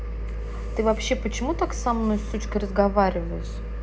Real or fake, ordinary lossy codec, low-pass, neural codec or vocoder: real; none; none; none